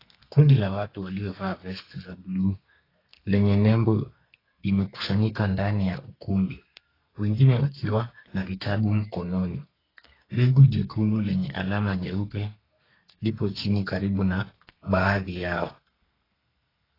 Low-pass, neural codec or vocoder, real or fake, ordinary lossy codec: 5.4 kHz; codec, 32 kHz, 1.9 kbps, SNAC; fake; AAC, 24 kbps